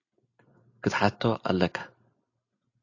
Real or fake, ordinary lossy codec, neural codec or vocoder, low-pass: real; AAC, 48 kbps; none; 7.2 kHz